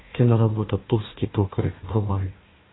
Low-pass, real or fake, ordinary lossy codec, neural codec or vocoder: 7.2 kHz; fake; AAC, 16 kbps; codec, 16 kHz, 1 kbps, FunCodec, trained on Chinese and English, 50 frames a second